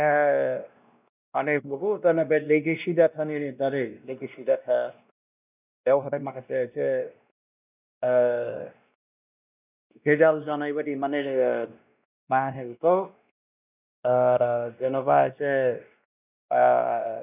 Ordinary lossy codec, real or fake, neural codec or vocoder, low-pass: none; fake; codec, 16 kHz, 1 kbps, X-Codec, WavLM features, trained on Multilingual LibriSpeech; 3.6 kHz